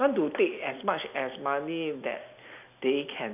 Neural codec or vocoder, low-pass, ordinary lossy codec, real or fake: none; 3.6 kHz; none; real